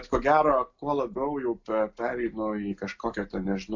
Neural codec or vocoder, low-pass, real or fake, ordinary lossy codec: none; 7.2 kHz; real; AAC, 48 kbps